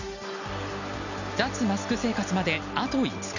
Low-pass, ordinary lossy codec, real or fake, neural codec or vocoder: 7.2 kHz; none; real; none